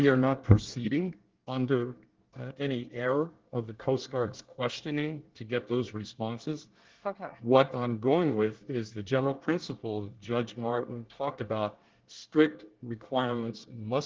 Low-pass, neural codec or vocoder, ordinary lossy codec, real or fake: 7.2 kHz; codec, 24 kHz, 1 kbps, SNAC; Opus, 16 kbps; fake